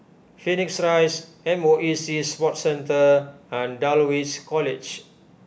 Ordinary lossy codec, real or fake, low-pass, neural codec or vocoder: none; real; none; none